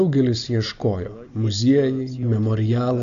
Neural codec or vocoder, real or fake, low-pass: none; real; 7.2 kHz